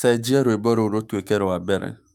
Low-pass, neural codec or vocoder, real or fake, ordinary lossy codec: 19.8 kHz; autoencoder, 48 kHz, 128 numbers a frame, DAC-VAE, trained on Japanese speech; fake; none